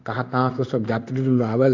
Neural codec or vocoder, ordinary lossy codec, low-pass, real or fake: codec, 16 kHz in and 24 kHz out, 1 kbps, XY-Tokenizer; AAC, 48 kbps; 7.2 kHz; fake